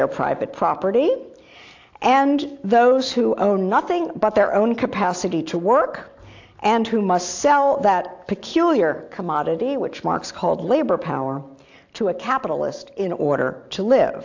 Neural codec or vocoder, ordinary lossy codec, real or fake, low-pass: none; AAC, 48 kbps; real; 7.2 kHz